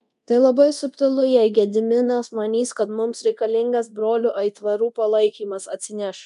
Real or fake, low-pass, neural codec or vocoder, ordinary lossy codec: fake; 10.8 kHz; codec, 24 kHz, 0.9 kbps, DualCodec; MP3, 96 kbps